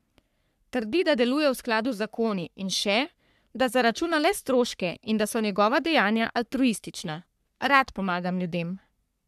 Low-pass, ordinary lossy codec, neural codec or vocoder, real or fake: 14.4 kHz; none; codec, 44.1 kHz, 3.4 kbps, Pupu-Codec; fake